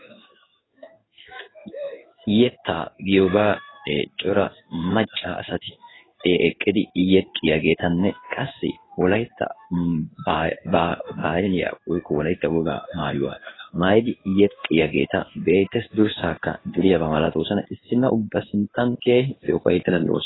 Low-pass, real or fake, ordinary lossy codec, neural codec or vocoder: 7.2 kHz; fake; AAC, 16 kbps; codec, 16 kHz in and 24 kHz out, 1 kbps, XY-Tokenizer